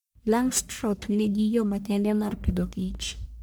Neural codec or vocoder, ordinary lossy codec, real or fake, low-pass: codec, 44.1 kHz, 1.7 kbps, Pupu-Codec; none; fake; none